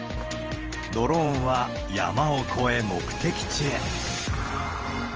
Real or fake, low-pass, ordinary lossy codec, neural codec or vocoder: fake; 7.2 kHz; Opus, 24 kbps; vocoder, 44.1 kHz, 128 mel bands every 512 samples, BigVGAN v2